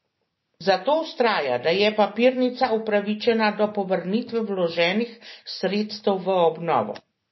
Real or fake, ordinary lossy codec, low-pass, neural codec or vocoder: real; MP3, 24 kbps; 7.2 kHz; none